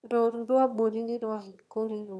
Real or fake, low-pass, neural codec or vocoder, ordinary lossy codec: fake; none; autoencoder, 22.05 kHz, a latent of 192 numbers a frame, VITS, trained on one speaker; none